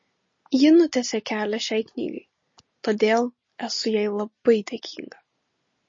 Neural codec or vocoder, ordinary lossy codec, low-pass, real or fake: none; MP3, 32 kbps; 7.2 kHz; real